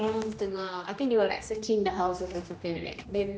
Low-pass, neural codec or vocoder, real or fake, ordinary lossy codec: none; codec, 16 kHz, 1 kbps, X-Codec, HuBERT features, trained on general audio; fake; none